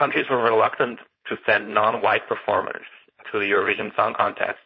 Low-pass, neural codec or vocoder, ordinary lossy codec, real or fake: 7.2 kHz; codec, 16 kHz, 4.8 kbps, FACodec; MP3, 24 kbps; fake